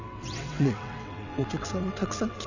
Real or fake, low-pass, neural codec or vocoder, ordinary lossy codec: fake; 7.2 kHz; vocoder, 22.05 kHz, 80 mel bands, WaveNeXt; none